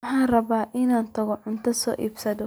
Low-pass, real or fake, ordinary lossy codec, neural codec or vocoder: none; real; none; none